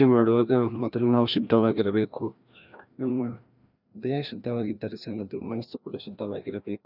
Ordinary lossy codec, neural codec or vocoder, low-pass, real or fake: none; codec, 16 kHz, 1 kbps, FreqCodec, larger model; 5.4 kHz; fake